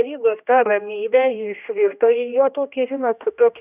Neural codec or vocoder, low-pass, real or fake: codec, 16 kHz, 1 kbps, X-Codec, HuBERT features, trained on general audio; 3.6 kHz; fake